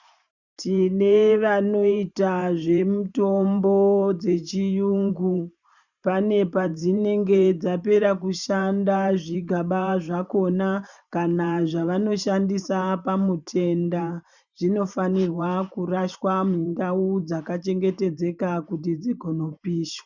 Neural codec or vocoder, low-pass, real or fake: vocoder, 44.1 kHz, 128 mel bands every 512 samples, BigVGAN v2; 7.2 kHz; fake